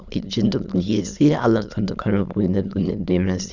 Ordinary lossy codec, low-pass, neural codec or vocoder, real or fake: none; 7.2 kHz; autoencoder, 22.05 kHz, a latent of 192 numbers a frame, VITS, trained on many speakers; fake